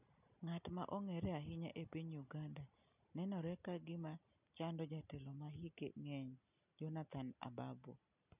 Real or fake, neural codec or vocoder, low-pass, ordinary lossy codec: real; none; 3.6 kHz; none